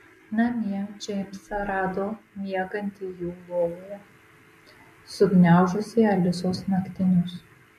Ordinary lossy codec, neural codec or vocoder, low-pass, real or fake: MP3, 64 kbps; none; 14.4 kHz; real